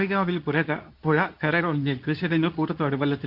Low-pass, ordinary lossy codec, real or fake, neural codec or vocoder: 5.4 kHz; none; fake; codec, 24 kHz, 0.9 kbps, WavTokenizer, medium speech release version 2